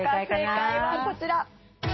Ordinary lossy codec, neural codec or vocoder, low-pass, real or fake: MP3, 24 kbps; none; 7.2 kHz; real